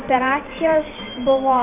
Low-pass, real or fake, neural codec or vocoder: 3.6 kHz; fake; vocoder, 44.1 kHz, 128 mel bands every 512 samples, BigVGAN v2